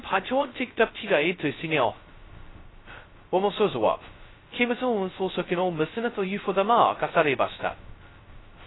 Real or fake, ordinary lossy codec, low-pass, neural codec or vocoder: fake; AAC, 16 kbps; 7.2 kHz; codec, 16 kHz, 0.2 kbps, FocalCodec